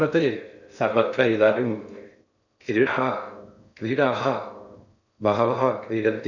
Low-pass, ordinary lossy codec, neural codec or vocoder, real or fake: 7.2 kHz; none; codec, 16 kHz in and 24 kHz out, 0.6 kbps, FocalCodec, streaming, 2048 codes; fake